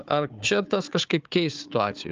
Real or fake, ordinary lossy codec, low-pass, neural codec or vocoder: fake; Opus, 24 kbps; 7.2 kHz; codec, 16 kHz, 4 kbps, FunCodec, trained on Chinese and English, 50 frames a second